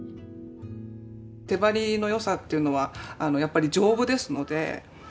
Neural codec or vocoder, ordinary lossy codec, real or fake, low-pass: none; none; real; none